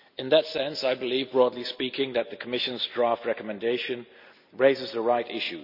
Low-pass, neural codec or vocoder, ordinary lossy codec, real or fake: 5.4 kHz; none; none; real